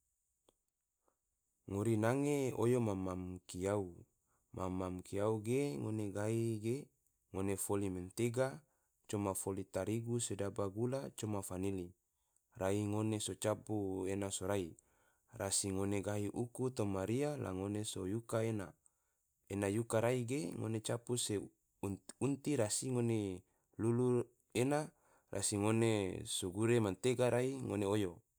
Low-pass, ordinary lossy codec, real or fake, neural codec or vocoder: none; none; real; none